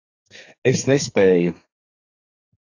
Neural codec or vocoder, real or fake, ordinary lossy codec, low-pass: codec, 32 kHz, 1.9 kbps, SNAC; fake; AAC, 48 kbps; 7.2 kHz